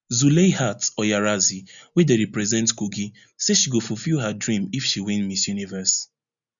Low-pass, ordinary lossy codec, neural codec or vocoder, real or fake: 7.2 kHz; none; none; real